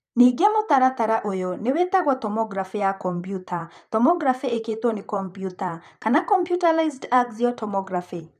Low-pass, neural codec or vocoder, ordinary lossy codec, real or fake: 14.4 kHz; vocoder, 44.1 kHz, 128 mel bands, Pupu-Vocoder; none; fake